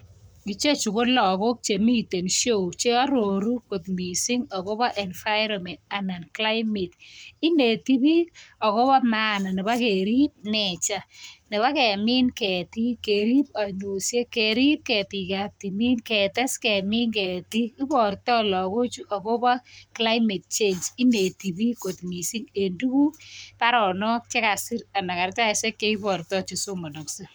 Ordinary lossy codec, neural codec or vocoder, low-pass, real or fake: none; codec, 44.1 kHz, 7.8 kbps, Pupu-Codec; none; fake